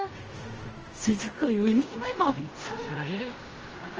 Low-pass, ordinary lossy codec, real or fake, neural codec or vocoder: 7.2 kHz; Opus, 24 kbps; fake; codec, 16 kHz in and 24 kHz out, 0.4 kbps, LongCat-Audio-Codec, fine tuned four codebook decoder